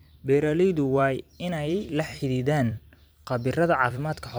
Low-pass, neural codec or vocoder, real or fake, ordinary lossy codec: none; vocoder, 44.1 kHz, 128 mel bands every 256 samples, BigVGAN v2; fake; none